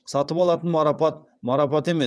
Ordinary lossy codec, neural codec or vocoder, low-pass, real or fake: none; vocoder, 22.05 kHz, 80 mel bands, WaveNeXt; none; fake